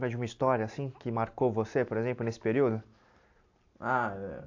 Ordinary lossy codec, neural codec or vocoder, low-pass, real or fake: AAC, 48 kbps; none; 7.2 kHz; real